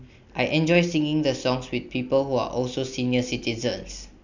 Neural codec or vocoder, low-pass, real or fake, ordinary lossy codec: none; 7.2 kHz; real; none